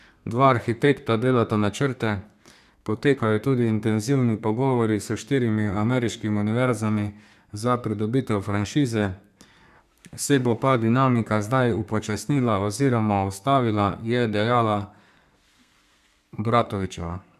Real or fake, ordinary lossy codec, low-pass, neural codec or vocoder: fake; none; 14.4 kHz; codec, 32 kHz, 1.9 kbps, SNAC